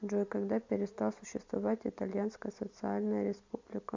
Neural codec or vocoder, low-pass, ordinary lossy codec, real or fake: none; 7.2 kHz; MP3, 64 kbps; real